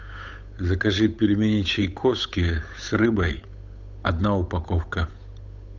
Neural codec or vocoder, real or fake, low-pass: codec, 16 kHz, 8 kbps, FunCodec, trained on Chinese and English, 25 frames a second; fake; 7.2 kHz